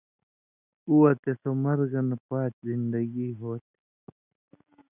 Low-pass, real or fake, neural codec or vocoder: 3.6 kHz; real; none